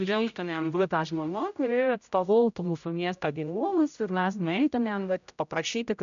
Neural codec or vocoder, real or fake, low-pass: codec, 16 kHz, 0.5 kbps, X-Codec, HuBERT features, trained on general audio; fake; 7.2 kHz